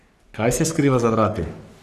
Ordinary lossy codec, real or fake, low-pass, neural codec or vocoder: none; fake; 14.4 kHz; codec, 44.1 kHz, 3.4 kbps, Pupu-Codec